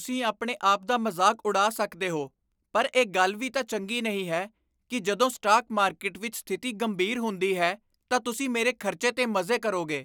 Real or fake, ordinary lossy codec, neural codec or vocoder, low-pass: real; none; none; none